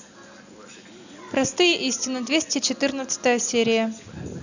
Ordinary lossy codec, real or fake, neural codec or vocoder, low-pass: none; real; none; 7.2 kHz